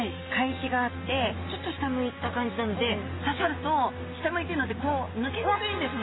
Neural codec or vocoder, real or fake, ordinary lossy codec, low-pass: none; real; AAC, 16 kbps; 7.2 kHz